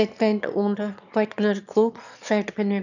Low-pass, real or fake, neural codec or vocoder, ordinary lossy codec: 7.2 kHz; fake; autoencoder, 22.05 kHz, a latent of 192 numbers a frame, VITS, trained on one speaker; none